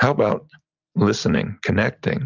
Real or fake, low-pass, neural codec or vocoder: real; 7.2 kHz; none